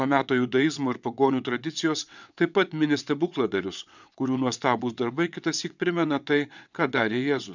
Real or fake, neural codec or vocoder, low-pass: fake; vocoder, 22.05 kHz, 80 mel bands, WaveNeXt; 7.2 kHz